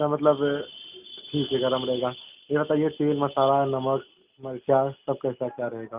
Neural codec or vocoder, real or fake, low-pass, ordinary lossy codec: none; real; 3.6 kHz; Opus, 24 kbps